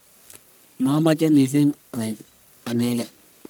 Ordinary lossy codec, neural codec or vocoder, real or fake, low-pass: none; codec, 44.1 kHz, 1.7 kbps, Pupu-Codec; fake; none